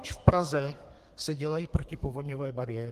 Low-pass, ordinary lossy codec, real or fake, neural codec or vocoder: 14.4 kHz; Opus, 24 kbps; fake; codec, 32 kHz, 1.9 kbps, SNAC